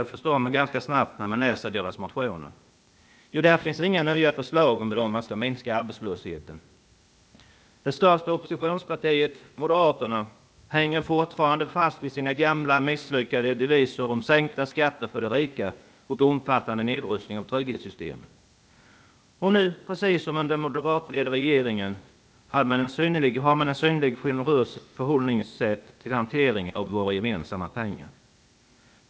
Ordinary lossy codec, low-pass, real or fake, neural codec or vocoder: none; none; fake; codec, 16 kHz, 0.8 kbps, ZipCodec